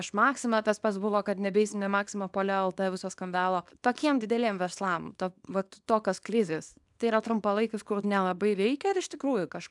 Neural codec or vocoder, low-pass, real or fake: codec, 24 kHz, 0.9 kbps, WavTokenizer, small release; 10.8 kHz; fake